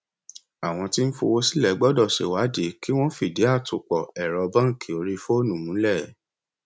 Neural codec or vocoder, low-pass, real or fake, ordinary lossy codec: none; none; real; none